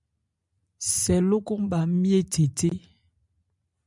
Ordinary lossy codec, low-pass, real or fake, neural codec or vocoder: MP3, 96 kbps; 10.8 kHz; real; none